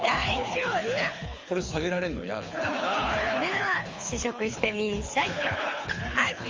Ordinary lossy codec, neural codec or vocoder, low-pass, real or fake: Opus, 32 kbps; codec, 24 kHz, 6 kbps, HILCodec; 7.2 kHz; fake